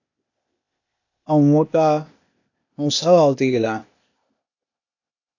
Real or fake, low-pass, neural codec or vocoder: fake; 7.2 kHz; codec, 16 kHz, 0.8 kbps, ZipCodec